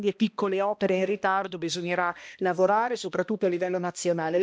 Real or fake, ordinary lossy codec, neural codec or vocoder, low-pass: fake; none; codec, 16 kHz, 1 kbps, X-Codec, HuBERT features, trained on balanced general audio; none